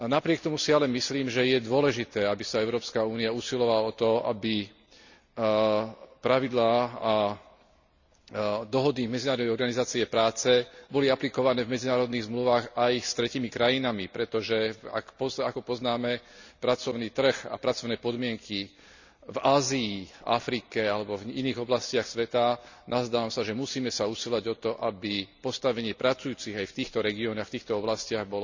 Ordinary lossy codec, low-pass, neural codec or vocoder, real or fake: none; 7.2 kHz; none; real